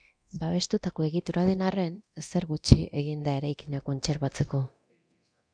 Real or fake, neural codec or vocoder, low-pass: fake; codec, 24 kHz, 0.9 kbps, DualCodec; 9.9 kHz